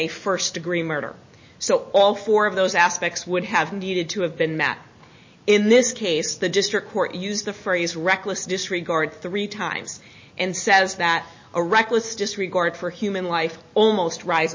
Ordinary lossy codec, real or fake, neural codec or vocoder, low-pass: MP3, 32 kbps; real; none; 7.2 kHz